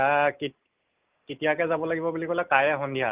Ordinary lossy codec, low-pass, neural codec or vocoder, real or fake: Opus, 32 kbps; 3.6 kHz; none; real